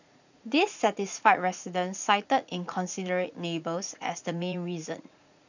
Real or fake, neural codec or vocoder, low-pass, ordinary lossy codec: fake; vocoder, 44.1 kHz, 80 mel bands, Vocos; 7.2 kHz; none